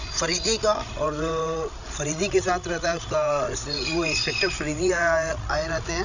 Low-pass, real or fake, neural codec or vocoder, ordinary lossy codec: 7.2 kHz; fake; codec, 16 kHz, 16 kbps, FreqCodec, larger model; none